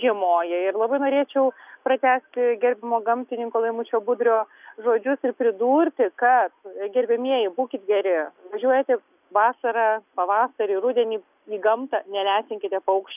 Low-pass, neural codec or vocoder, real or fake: 3.6 kHz; none; real